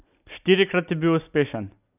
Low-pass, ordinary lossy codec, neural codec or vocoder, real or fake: 3.6 kHz; none; none; real